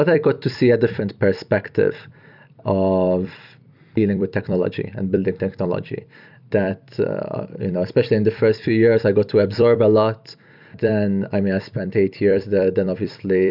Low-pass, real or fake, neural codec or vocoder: 5.4 kHz; fake; vocoder, 44.1 kHz, 128 mel bands every 256 samples, BigVGAN v2